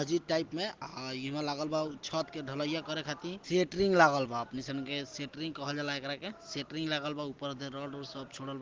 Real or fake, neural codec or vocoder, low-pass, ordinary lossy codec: real; none; 7.2 kHz; Opus, 16 kbps